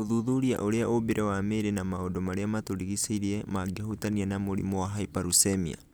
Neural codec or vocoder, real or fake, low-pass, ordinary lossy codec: none; real; none; none